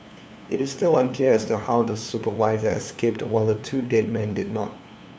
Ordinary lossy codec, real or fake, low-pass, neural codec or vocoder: none; fake; none; codec, 16 kHz, 2 kbps, FunCodec, trained on LibriTTS, 25 frames a second